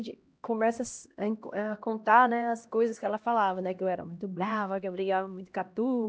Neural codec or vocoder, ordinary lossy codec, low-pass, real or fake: codec, 16 kHz, 1 kbps, X-Codec, HuBERT features, trained on LibriSpeech; none; none; fake